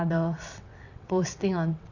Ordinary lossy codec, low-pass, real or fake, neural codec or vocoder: none; 7.2 kHz; fake; vocoder, 22.05 kHz, 80 mel bands, WaveNeXt